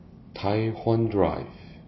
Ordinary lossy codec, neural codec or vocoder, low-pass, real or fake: MP3, 24 kbps; none; 7.2 kHz; real